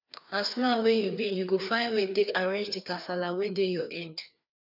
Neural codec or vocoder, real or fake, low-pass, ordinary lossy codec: codec, 16 kHz, 2 kbps, FreqCodec, larger model; fake; 5.4 kHz; none